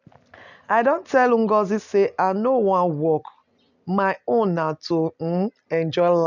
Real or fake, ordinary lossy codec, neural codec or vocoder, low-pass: real; none; none; 7.2 kHz